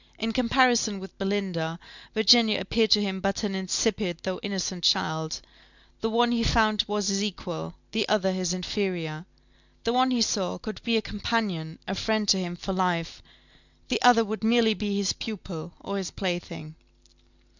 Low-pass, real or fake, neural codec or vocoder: 7.2 kHz; real; none